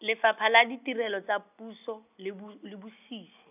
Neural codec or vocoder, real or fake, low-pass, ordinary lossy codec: none; real; 3.6 kHz; none